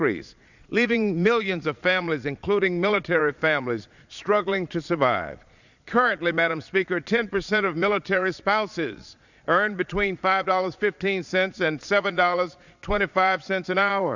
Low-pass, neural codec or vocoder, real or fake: 7.2 kHz; vocoder, 22.05 kHz, 80 mel bands, Vocos; fake